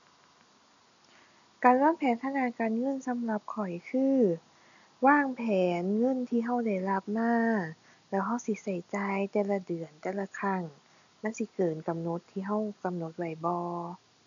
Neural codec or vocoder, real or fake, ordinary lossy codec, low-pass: none; real; none; 7.2 kHz